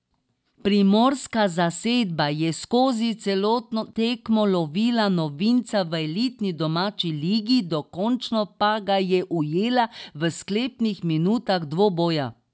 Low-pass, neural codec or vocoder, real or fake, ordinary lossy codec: none; none; real; none